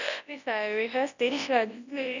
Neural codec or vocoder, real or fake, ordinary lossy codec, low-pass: codec, 24 kHz, 0.9 kbps, WavTokenizer, large speech release; fake; none; 7.2 kHz